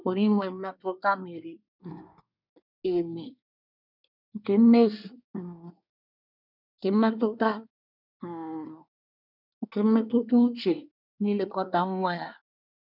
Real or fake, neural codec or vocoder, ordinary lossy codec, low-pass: fake; codec, 24 kHz, 1 kbps, SNAC; none; 5.4 kHz